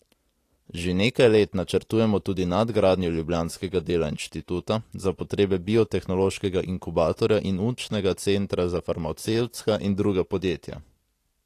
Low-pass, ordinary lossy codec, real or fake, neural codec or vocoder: 14.4 kHz; AAC, 48 kbps; real; none